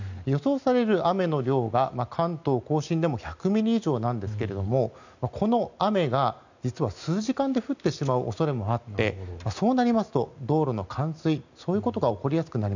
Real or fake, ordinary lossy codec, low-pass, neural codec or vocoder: real; none; 7.2 kHz; none